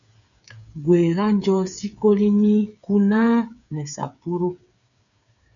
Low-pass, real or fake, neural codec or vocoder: 7.2 kHz; fake; codec, 16 kHz, 8 kbps, FreqCodec, smaller model